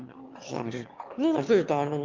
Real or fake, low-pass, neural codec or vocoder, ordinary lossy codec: fake; 7.2 kHz; autoencoder, 22.05 kHz, a latent of 192 numbers a frame, VITS, trained on one speaker; Opus, 24 kbps